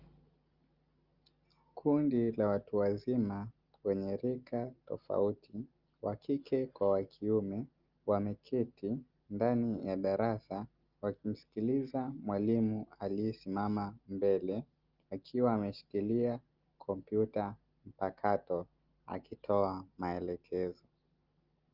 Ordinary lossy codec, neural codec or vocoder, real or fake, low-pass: Opus, 32 kbps; none; real; 5.4 kHz